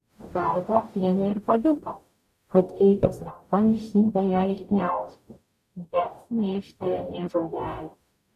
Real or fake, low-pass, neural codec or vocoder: fake; 14.4 kHz; codec, 44.1 kHz, 0.9 kbps, DAC